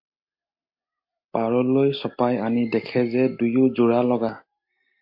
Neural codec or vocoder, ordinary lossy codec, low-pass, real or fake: none; MP3, 32 kbps; 5.4 kHz; real